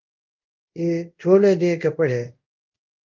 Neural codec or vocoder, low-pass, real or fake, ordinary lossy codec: codec, 24 kHz, 0.5 kbps, DualCodec; 7.2 kHz; fake; Opus, 24 kbps